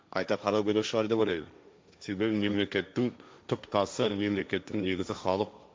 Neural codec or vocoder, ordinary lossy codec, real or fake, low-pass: codec, 16 kHz, 1.1 kbps, Voila-Tokenizer; none; fake; none